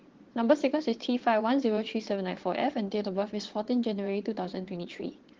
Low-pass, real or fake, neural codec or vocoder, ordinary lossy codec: 7.2 kHz; fake; vocoder, 22.05 kHz, 80 mel bands, Vocos; Opus, 16 kbps